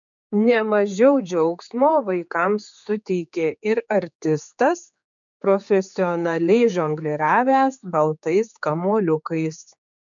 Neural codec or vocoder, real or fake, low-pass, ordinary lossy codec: codec, 16 kHz, 4 kbps, X-Codec, HuBERT features, trained on general audio; fake; 7.2 kHz; MP3, 96 kbps